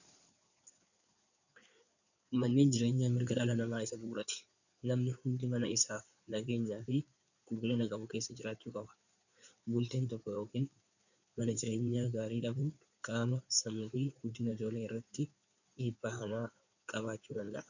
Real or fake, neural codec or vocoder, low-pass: fake; codec, 16 kHz in and 24 kHz out, 2.2 kbps, FireRedTTS-2 codec; 7.2 kHz